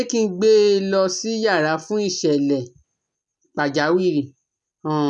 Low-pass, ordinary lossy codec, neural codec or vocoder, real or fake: 10.8 kHz; none; none; real